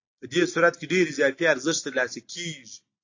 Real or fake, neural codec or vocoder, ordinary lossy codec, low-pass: real; none; AAC, 48 kbps; 7.2 kHz